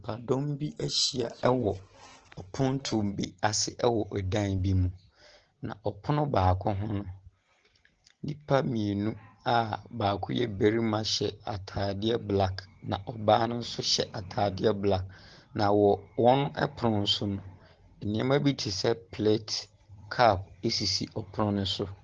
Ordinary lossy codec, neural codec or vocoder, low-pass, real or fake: Opus, 16 kbps; none; 7.2 kHz; real